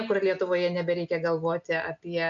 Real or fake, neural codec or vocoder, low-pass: real; none; 7.2 kHz